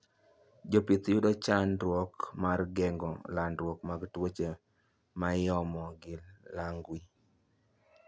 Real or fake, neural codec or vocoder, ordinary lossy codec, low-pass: real; none; none; none